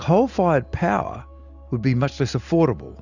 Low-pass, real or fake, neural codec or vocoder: 7.2 kHz; real; none